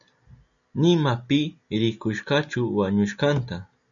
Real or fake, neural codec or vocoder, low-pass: real; none; 7.2 kHz